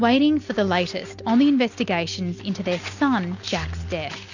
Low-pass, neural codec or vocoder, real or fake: 7.2 kHz; none; real